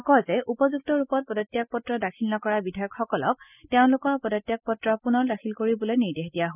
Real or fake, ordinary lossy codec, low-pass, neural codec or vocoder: real; none; 3.6 kHz; none